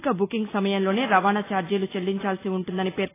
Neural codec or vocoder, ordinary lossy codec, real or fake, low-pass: none; AAC, 16 kbps; real; 3.6 kHz